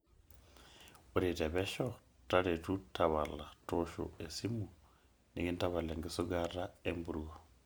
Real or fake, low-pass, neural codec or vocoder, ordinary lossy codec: real; none; none; none